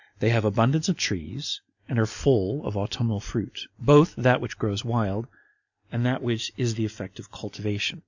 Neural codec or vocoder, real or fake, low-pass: none; real; 7.2 kHz